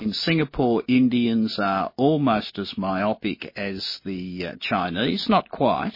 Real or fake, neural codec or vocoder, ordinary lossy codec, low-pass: real; none; MP3, 24 kbps; 5.4 kHz